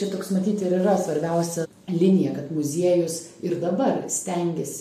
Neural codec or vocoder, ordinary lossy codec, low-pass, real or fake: none; AAC, 96 kbps; 14.4 kHz; real